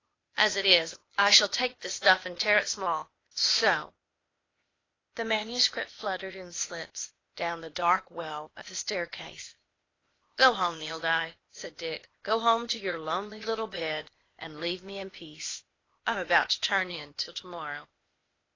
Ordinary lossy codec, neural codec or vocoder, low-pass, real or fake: AAC, 32 kbps; codec, 16 kHz, 0.8 kbps, ZipCodec; 7.2 kHz; fake